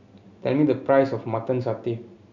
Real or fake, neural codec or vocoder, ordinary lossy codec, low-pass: real; none; none; 7.2 kHz